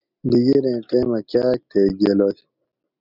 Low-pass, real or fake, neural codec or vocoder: 5.4 kHz; real; none